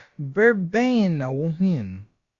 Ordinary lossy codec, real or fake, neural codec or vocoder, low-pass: Opus, 64 kbps; fake; codec, 16 kHz, about 1 kbps, DyCAST, with the encoder's durations; 7.2 kHz